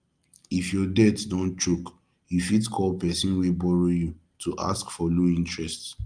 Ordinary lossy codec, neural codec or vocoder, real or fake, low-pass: Opus, 32 kbps; none; real; 9.9 kHz